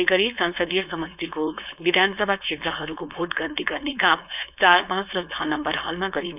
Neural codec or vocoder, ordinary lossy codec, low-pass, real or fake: codec, 16 kHz, 4.8 kbps, FACodec; none; 3.6 kHz; fake